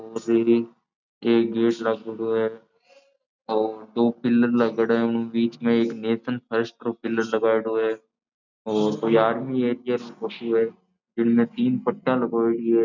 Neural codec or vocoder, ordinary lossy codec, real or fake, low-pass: none; none; real; 7.2 kHz